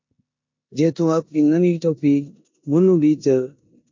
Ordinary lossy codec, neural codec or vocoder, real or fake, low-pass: MP3, 48 kbps; codec, 16 kHz in and 24 kHz out, 0.9 kbps, LongCat-Audio-Codec, four codebook decoder; fake; 7.2 kHz